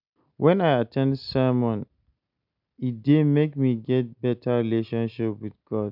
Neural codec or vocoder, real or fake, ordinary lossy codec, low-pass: none; real; none; 5.4 kHz